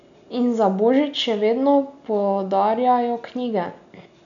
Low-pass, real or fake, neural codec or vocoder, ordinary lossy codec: 7.2 kHz; real; none; none